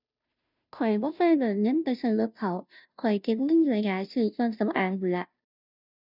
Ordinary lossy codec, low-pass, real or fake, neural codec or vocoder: none; 5.4 kHz; fake; codec, 16 kHz, 0.5 kbps, FunCodec, trained on Chinese and English, 25 frames a second